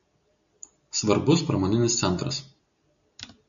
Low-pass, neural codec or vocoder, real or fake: 7.2 kHz; none; real